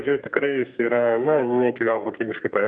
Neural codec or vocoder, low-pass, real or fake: codec, 32 kHz, 1.9 kbps, SNAC; 9.9 kHz; fake